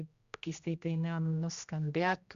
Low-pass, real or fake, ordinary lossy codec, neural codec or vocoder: 7.2 kHz; fake; Opus, 64 kbps; codec, 16 kHz, 1 kbps, X-Codec, HuBERT features, trained on general audio